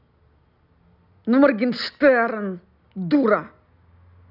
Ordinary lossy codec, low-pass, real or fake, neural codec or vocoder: none; 5.4 kHz; real; none